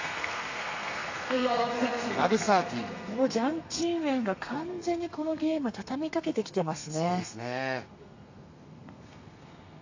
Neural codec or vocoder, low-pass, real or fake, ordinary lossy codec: codec, 32 kHz, 1.9 kbps, SNAC; 7.2 kHz; fake; AAC, 48 kbps